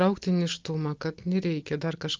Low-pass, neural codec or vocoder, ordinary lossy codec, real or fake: 7.2 kHz; none; Opus, 24 kbps; real